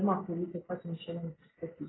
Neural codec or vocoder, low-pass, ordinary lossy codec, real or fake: none; 7.2 kHz; AAC, 16 kbps; real